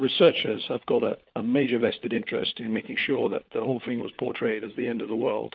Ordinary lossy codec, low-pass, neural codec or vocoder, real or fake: Opus, 24 kbps; 7.2 kHz; codec, 16 kHz, 4 kbps, FunCodec, trained on LibriTTS, 50 frames a second; fake